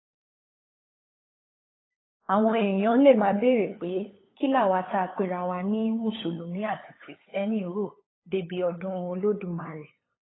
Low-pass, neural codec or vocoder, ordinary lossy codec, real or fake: 7.2 kHz; codec, 16 kHz, 8 kbps, FunCodec, trained on LibriTTS, 25 frames a second; AAC, 16 kbps; fake